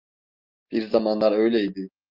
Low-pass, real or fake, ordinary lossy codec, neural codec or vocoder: 5.4 kHz; real; Opus, 24 kbps; none